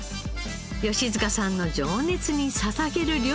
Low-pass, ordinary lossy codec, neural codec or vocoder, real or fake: none; none; none; real